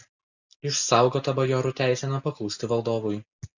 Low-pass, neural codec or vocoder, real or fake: 7.2 kHz; none; real